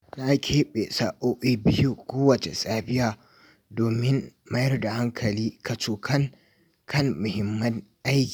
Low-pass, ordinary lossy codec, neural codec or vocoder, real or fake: none; none; none; real